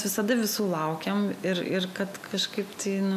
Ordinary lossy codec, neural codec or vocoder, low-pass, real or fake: MP3, 96 kbps; none; 14.4 kHz; real